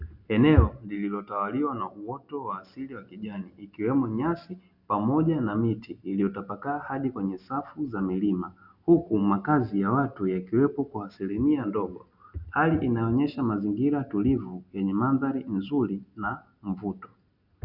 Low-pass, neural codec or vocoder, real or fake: 5.4 kHz; none; real